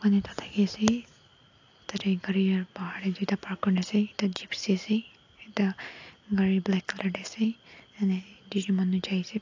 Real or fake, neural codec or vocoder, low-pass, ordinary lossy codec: real; none; 7.2 kHz; AAC, 48 kbps